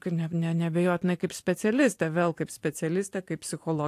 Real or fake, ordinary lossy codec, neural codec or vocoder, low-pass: fake; AAC, 64 kbps; vocoder, 44.1 kHz, 128 mel bands every 512 samples, BigVGAN v2; 14.4 kHz